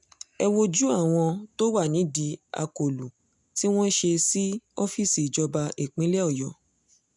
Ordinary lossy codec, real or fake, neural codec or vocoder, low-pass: none; real; none; 10.8 kHz